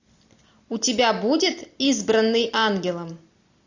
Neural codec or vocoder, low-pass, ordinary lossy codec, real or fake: none; 7.2 kHz; AAC, 48 kbps; real